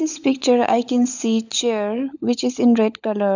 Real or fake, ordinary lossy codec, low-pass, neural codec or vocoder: fake; none; 7.2 kHz; codec, 16 kHz, 16 kbps, FunCodec, trained on LibriTTS, 50 frames a second